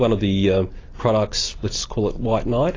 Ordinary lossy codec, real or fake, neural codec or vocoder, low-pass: AAC, 32 kbps; real; none; 7.2 kHz